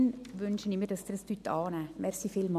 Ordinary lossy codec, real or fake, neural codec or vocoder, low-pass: none; real; none; 14.4 kHz